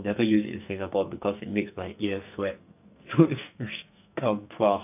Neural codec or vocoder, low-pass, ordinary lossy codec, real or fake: codec, 44.1 kHz, 2.6 kbps, SNAC; 3.6 kHz; none; fake